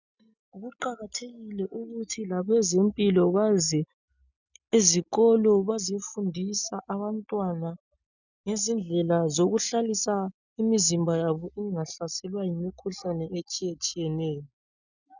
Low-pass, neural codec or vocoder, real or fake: 7.2 kHz; none; real